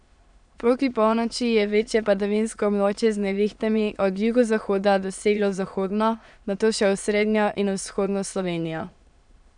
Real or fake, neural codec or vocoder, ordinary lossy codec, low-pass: fake; autoencoder, 22.05 kHz, a latent of 192 numbers a frame, VITS, trained on many speakers; none; 9.9 kHz